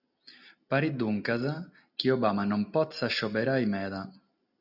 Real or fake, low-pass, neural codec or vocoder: real; 5.4 kHz; none